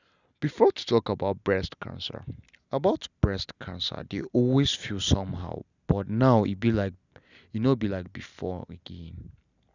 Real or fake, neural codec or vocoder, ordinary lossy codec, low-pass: real; none; none; 7.2 kHz